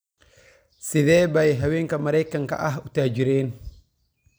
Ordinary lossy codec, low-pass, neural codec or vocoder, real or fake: none; none; none; real